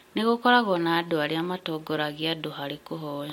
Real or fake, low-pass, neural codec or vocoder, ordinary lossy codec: real; 19.8 kHz; none; MP3, 64 kbps